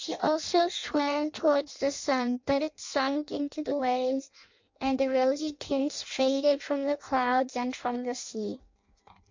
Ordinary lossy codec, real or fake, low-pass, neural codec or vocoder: MP3, 48 kbps; fake; 7.2 kHz; codec, 16 kHz in and 24 kHz out, 0.6 kbps, FireRedTTS-2 codec